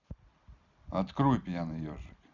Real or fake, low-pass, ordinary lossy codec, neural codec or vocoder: real; 7.2 kHz; none; none